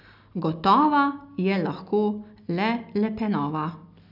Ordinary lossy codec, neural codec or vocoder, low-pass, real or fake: none; none; 5.4 kHz; real